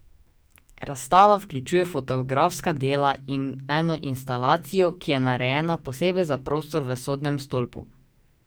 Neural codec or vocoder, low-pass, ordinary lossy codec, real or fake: codec, 44.1 kHz, 2.6 kbps, SNAC; none; none; fake